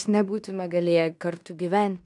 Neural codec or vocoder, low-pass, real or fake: codec, 16 kHz in and 24 kHz out, 0.9 kbps, LongCat-Audio-Codec, fine tuned four codebook decoder; 10.8 kHz; fake